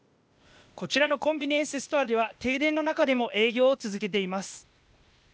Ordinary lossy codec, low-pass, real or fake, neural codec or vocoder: none; none; fake; codec, 16 kHz, 0.8 kbps, ZipCodec